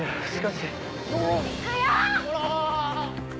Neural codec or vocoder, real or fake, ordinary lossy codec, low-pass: none; real; none; none